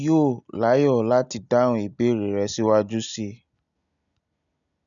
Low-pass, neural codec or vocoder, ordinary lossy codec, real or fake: 7.2 kHz; none; none; real